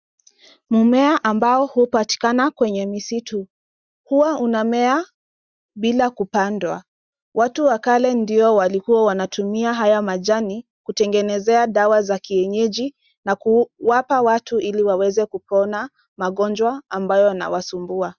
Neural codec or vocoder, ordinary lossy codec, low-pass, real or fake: none; Opus, 64 kbps; 7.2 kHz; real